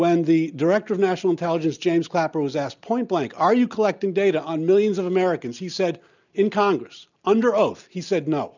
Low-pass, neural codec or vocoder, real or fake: 7.2 kHz; none; real